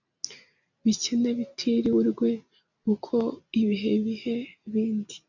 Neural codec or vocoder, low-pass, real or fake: none; 7.2 kHz; real